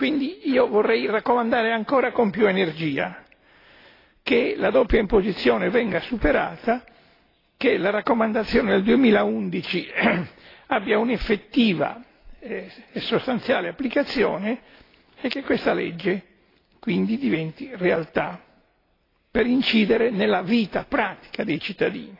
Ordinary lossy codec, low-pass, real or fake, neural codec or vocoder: AAC, 24 kbps; 5.4 kHz; real; none